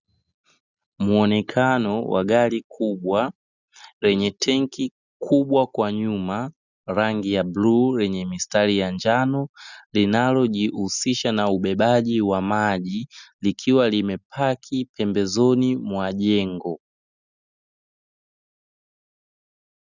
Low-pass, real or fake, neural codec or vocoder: 7.2 kHz; real; none